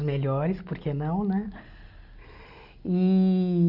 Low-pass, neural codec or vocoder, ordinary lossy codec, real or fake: 5.4 kHz; none; none; real